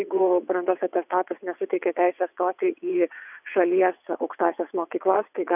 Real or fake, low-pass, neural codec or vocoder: fake; 3.6 kHz; vocoder, 22.05 kHz, 80 mel bands, WaveNeXt